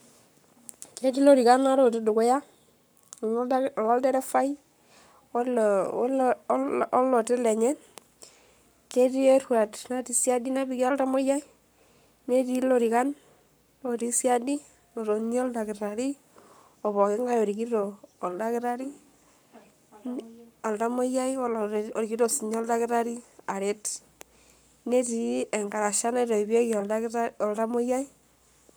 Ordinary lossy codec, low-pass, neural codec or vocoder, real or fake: none; none; codec, 44.1 kHz, 7.8 kbps, Pupu-Codec; fake